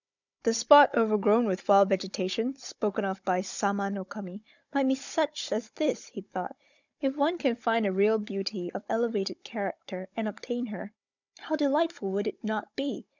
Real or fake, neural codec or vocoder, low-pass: fake; codec, 16 kHz, 16 kbps, FunCodec, trained on Chinese and English, 50 frames a second; 7.2 kHz